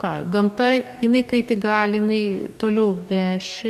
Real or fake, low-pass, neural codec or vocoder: fake; 14.4 kHz; codec, 44.1 kHz, 2.6 kbps, DAC